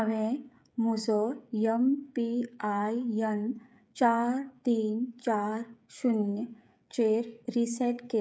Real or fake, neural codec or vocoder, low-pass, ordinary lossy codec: fake; codec, 16 kHz, 16 kbps, FreqCodec, smaller model; none; none